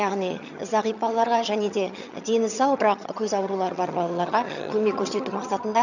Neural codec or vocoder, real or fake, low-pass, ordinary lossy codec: vocoder, 22.05 kHz, 80 mel bands, HiFi-GAN; fake; 7.2 kHz; none